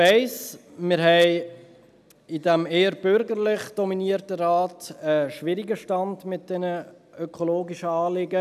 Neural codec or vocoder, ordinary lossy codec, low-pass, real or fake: none; none; 14.4 kHz; real